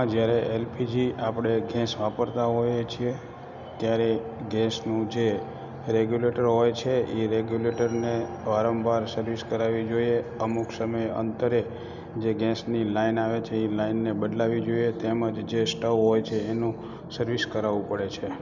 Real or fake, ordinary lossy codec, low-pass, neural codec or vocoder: real; none; 7.2 kHz; none